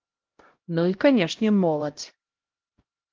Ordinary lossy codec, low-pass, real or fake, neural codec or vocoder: Opus, 16 kbps; 7.2 kHz; fake; codec, 16 kHz, 0.5 kbps, X-Codec, HuBERT features, trained on LibriSpeech